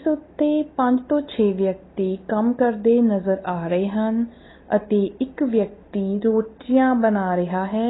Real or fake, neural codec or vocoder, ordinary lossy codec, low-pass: real; none; AAC, 16 kbps; 7.2 kHz